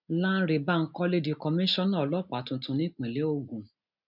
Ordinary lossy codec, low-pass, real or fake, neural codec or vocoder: none; 5.4 kHz; real; none